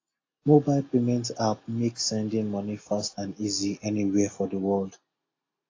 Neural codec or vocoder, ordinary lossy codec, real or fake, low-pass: none; AAC, 32 kbps; real; 7.2 kHz